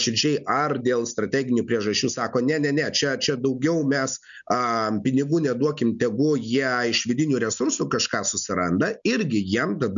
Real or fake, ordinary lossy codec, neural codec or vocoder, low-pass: real; MP3, 96 kbps; none; 7.2 kHz